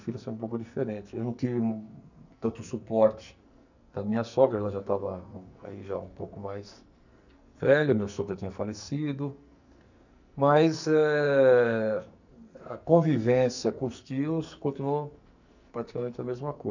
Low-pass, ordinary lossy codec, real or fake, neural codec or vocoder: 7.2 kHz; none; fake; codec, 44.1 kHz, 2.6 kbps, SNAC